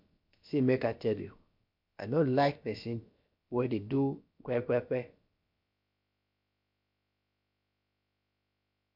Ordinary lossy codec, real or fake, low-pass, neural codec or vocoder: MP3, 48 kbps; fake; 5.4 kHz; codec, 16 kHz, about 1 kbps, DyCAST, with the encoder's durations